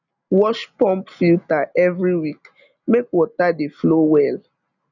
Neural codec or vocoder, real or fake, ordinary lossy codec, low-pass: none; real; none; 7.2 kHz